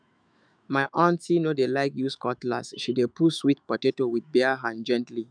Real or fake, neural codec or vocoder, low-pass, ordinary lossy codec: fake; autoencoder, 48 kHz, 128 numbers a frame, DAC-VAE, trained on Japanese speech; 9.9 kHz; none